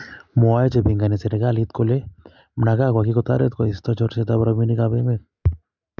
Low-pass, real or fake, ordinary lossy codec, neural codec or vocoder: 7.2 kHz; real; none; none